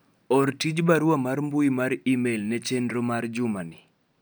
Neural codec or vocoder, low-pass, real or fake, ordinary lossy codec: none; none; real; none